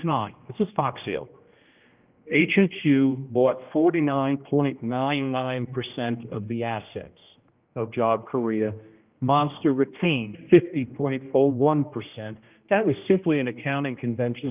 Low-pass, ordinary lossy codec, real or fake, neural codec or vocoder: 3.6 kHz; Opus, 24 kbps; fake; codec, 16 kHz, 1 kbps, X-Codec, HuBERT features, trained on general audio